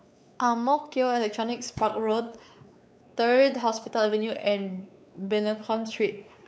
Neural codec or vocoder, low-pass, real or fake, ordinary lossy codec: codec, 16 kHz, 4 kbps, X-Codec, WavLM features, trained on Multilingual LibriSpeech; none; fake; none